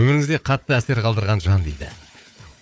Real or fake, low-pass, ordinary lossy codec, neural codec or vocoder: fake; none; none; codec, 16 kHz, 4 kbps, FunCodec, trained on Chinese and English, 50 frames a second